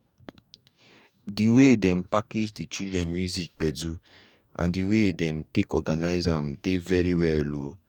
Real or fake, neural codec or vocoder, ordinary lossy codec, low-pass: fake; codec, 44.1 kHz, 2.6 kbps, DAC; none; 19.8 kHz